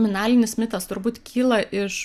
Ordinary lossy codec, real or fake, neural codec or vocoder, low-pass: Opus, 64 kbps; real; none; 14.4 kHz